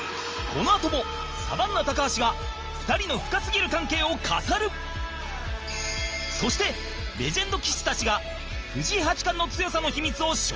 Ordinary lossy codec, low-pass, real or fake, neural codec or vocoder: Opus, 24 kbps; 7.2 kHz; real; none